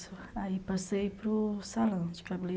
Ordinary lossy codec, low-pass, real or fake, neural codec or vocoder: none; none; real; none